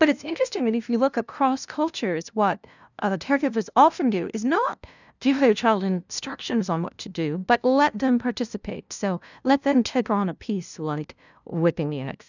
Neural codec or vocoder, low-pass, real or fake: codec, 16 kHz, 0.5 kbps, FunCodec, trained on LibriTTS, 25 frames a second; 7.2 kHz; fake